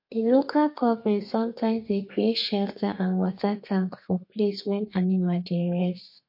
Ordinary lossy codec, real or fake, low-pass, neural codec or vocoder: MP3, 32 kbps; fake; 5.4 kHz; codec, 32 kHz, 1.9 kbps, SNAC